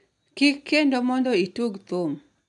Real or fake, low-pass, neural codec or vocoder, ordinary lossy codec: real; 10.8 kHz; none; none